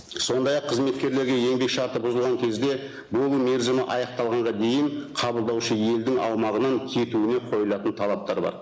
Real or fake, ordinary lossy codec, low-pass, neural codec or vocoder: real; none; none; none